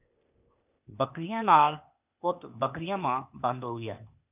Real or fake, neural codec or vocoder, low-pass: fake; codec, 16 kHz, 0.8 kbps, ZipCodec; 3.6 kHz